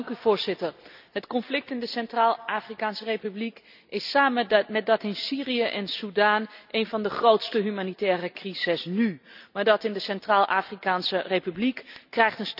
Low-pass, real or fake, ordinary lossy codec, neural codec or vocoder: 5.4 kHz; real; none; none